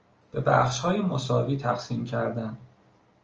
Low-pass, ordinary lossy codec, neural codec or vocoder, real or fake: 7.2 kHz; Opus, 24 kbps; none; real